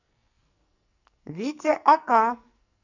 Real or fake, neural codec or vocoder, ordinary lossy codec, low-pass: fake; codec, 44.1 kHz, 2.6 kbps, SNAC; none; 7.2 kHz